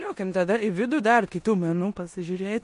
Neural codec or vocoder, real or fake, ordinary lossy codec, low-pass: codec, 16 kHz in and 24 kHz out, 0.9 kbps, LongCat-Audio-Codec, four codebook decoder; fake; MP3, 48 kbps; 10.8 kHz